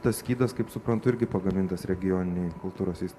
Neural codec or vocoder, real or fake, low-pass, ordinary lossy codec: none; real; 14.4 kHz; AAC, 96 kbps